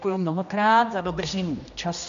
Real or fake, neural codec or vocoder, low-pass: fake; codec, 16 kHz, 1 kbps, X-Codec, HuBERT features, trained on general audio; 7.2 kHz